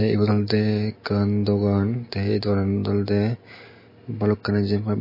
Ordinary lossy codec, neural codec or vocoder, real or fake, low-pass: MP3, 24 kbps; none; real; 5.4 kHz